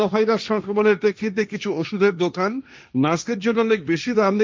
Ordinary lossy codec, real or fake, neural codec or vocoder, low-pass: none; fake; codec, 16 kHz, 1.1 kbps, Voila-Tokenizer; none